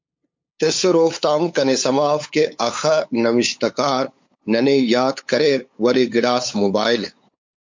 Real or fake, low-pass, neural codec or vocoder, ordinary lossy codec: fake; 7.2 kHz; codec, 16 kHz, 8 kbps, FunCodec, trained on LibriTTS, 25 frames a second; MP3, 48 kbps